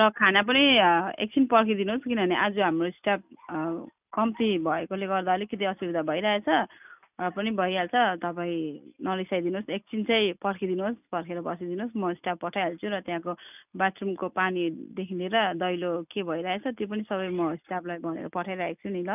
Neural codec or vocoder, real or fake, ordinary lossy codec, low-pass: none; real; none; 3.6 kHz